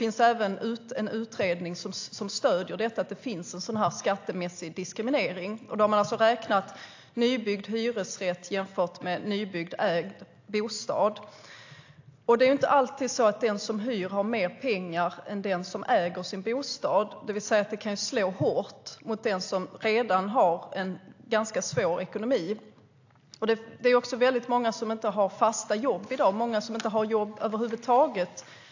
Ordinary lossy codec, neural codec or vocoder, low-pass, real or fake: AAC, 48 kbps; none; 7.2 kHz; real